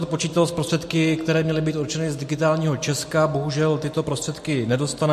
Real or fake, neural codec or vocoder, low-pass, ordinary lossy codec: real; none; 14.4 kHz; MP3, 64 kbps